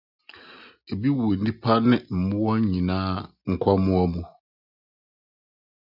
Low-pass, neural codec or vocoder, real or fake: 5.4 kHz; none; real